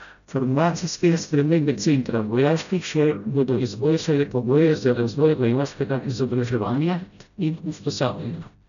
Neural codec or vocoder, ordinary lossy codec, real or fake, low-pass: codec, 16 kHz, 0.5 kbps, FreqCodec, smaller model; none; fake; 7.2 kHz